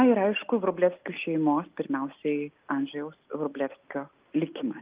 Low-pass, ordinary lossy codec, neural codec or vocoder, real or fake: 3.6 kHz; Opus, 32 kbps; none; real